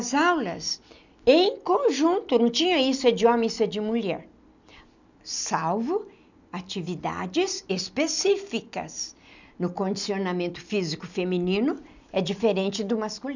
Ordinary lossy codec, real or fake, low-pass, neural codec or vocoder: none; real; 7.2 kHz; none